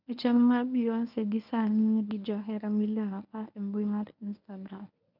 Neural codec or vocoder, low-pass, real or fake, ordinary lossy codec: codec, 24 kHz, 0.9 kbps, WavTokenizer, medium speech release version 1; 5.4 kHz; fake; none